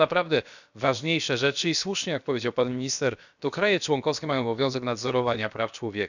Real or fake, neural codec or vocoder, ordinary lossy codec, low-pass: fake; codec, 16 kHz, about 1 kbps, DyCAST, with the encoder's durations; none; 7.2 kHz